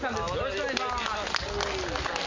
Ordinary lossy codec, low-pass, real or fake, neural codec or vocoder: MP3, 48 kbps; 7.2 kHz; real; none